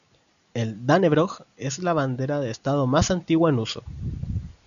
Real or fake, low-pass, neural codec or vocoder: real; 7.2 kHz; none